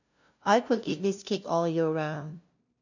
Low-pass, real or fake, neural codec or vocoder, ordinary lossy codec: 7.2 kHz; fake; codec, 16 kHz, 0.5 kbps, FunCodec, trained on LibriTTS, 25 frames a second; none